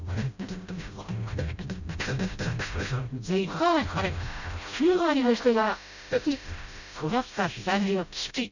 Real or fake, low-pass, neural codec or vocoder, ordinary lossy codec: fake; 7.2 kHz; codec, 16 kHz, 0.5 kbps, FreqCodec, smaller model; none